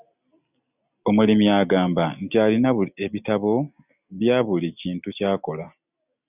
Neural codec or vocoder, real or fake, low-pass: none; real; 3.6 kHz